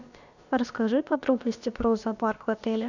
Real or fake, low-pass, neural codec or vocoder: fake; 7.2 kHz; codec, 16 kHz, about 1 kbps, DyCAST, with the encoder's durations